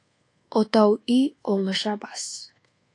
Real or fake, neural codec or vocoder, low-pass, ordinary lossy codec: fake; codec, 24 kHz, 1.2 kbps, DualCodec; 10.8 kHz; AAC, 48 kbps